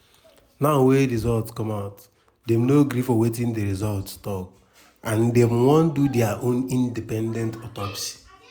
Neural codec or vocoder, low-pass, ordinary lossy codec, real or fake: none; none; none; real